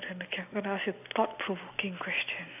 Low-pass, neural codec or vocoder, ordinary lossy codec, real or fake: 3.6 kHz; none; none; real